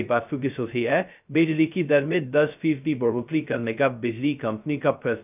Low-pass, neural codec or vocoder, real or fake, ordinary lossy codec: 3.6 kHz; codec, 16 kHz, 0.2 kbps, FocalCodec; fake; none